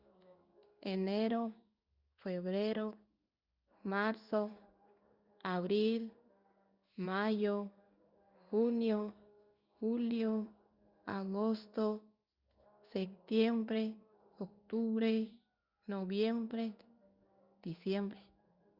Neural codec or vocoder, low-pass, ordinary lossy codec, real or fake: codec, 16 kHz in and 24 kHz out, 1 kbps, XY-Tokenizer; 5.4 kHz; Opus, 64 kbps; fake